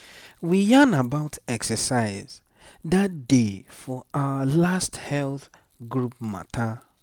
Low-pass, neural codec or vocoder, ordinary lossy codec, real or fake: 19.8 kHz; none; none; real